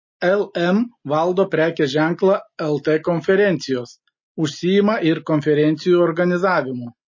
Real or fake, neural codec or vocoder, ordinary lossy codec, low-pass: real; none; MP3, 32 kbps; 7.2 kHz